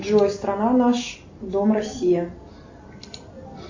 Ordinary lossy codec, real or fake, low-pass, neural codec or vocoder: AAC, 48 kbps; real; 7.2 kHz; none